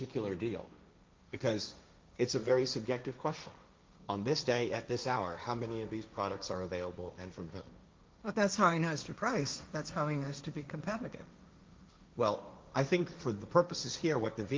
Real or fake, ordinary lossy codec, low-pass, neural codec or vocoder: fake; Opus, 24 kbps; 7.2 kHz; codec, 16 kHz, 1.1 kbps, Voila-Tokenizer